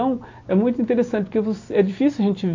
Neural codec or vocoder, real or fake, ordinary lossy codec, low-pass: none; real; AAC, 48 kbps; 7.2 kHz